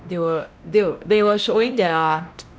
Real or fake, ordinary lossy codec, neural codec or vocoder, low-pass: fake; none; codec, 16 kHz, 1 kbps, X-Codec, WavLM features, trained on Multilingual LibriSpeech; none